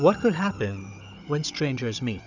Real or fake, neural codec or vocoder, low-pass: fake; codec, 16 kHz, 16 kbps, FunCodec, trained on Chinese and English, 50 frames a second; 7.2 kHz